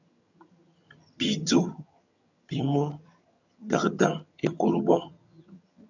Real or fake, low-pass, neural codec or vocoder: fake; 7.2 kHz; vocoder, 22.05 kHz, 80 mel bands, HiFi-GAN